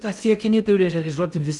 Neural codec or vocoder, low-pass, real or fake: codec, 16 kHz in and 24 kHz out, 0.6 kbps, FocalCodec, streaming, 2048 codes; 10.8 kHz; fake